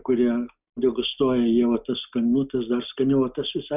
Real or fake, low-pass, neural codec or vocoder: real; 3.6 kHz; none